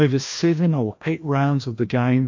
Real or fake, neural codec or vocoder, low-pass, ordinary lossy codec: fake; codec, 16 kHz, 1 kbps, FreqCodec, larger model; 7.2 kHz; MP3, 48 kbps